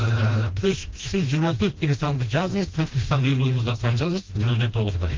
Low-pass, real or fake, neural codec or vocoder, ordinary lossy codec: 7.2 kHz; fake; codec, 16 kHz, 1 kbps, FreqCodec, smaller model; Opus, 32 kbps